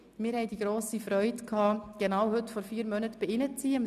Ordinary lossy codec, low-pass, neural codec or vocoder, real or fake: none; 14.4 kHz; none; real